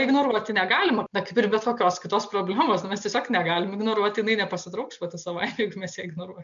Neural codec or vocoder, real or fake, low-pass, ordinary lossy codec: none; real; 7.2 kHz; MP3, 64 kbps